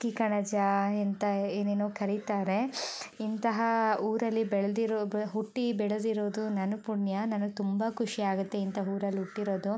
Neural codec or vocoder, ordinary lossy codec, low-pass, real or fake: none; none; none; real